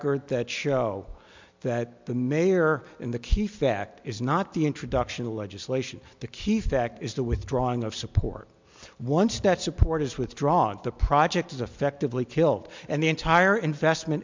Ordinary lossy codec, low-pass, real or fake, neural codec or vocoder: MP3, 64 kbps; 7.2 kHz; real; none